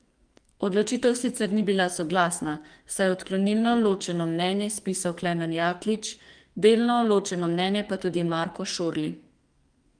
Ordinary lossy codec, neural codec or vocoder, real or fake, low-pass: Opus, 32 kbps; codec, 44.1 kHz, 2.6 kbps, SNAC; fake; 9.9 kHz